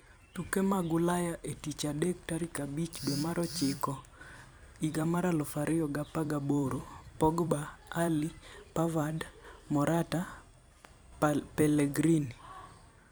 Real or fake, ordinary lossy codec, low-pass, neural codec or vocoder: fake; none; none; vocoder, 44.1 kHz, 128 mel bands every 256 samples, BigVGAN v2